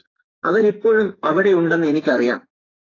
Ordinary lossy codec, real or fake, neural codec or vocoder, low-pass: AAC, 32 kbps; fake; codec, 44.1 kHz, 2.6 kbps, SNAC; 7.2 kHz